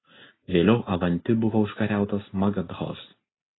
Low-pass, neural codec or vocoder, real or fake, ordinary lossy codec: 7.2 kHz; none; real; AAC, 16 kbps